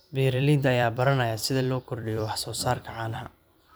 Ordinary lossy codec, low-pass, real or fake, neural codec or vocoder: none; none; fake; vocoder, 44.1 kHz, 128 mel bands every 256 samples, BigVGAN v2